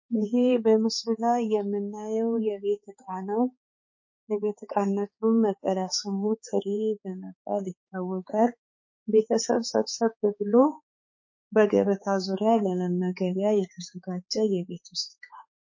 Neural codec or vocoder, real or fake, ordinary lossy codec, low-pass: codec, 16 kHz, 4 kbps, X-Codec, HuBERT features, trained on balanced general audio; fake; MP3, 32 kbps; 7.2 kHz